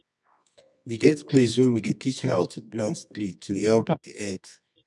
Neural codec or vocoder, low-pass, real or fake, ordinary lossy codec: codec, 24 kHz, 0.9 kbps, WavTokenizer, medium music audio release; 10.8 kHz; fake; none